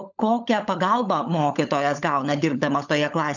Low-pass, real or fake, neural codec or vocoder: 7.2 kHz; fake; codec, 16 kHz, 16 kbps, FunCodec, trained on LibriTTS, 50 frames a second